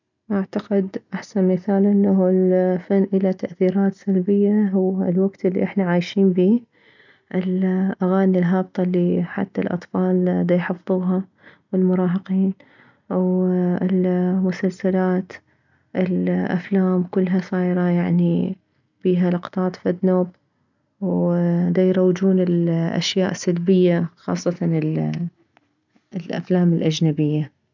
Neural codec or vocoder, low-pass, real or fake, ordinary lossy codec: none; 7.2 kHz; real; none